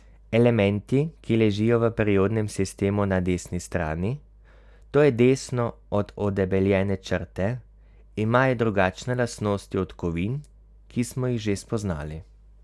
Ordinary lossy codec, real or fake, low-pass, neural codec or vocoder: none; real; none; none